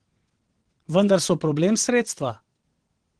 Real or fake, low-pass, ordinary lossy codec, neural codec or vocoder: fake; 9.9 kHz; Opus, 16 kbps; vocoder, 22.05 kHz, 80 mel bands, WaveNeXt